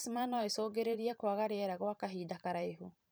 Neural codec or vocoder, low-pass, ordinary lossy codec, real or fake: vocoder, 44.1 kHz, 128 mel bands every 512 samples, BigVGAN v2; none; none; fake